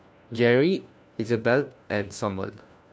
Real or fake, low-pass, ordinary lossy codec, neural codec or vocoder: fake; none; none; codec, 16 kHz, 1 kbps, FunCodec, trained on LibriTTS, 50 frames a second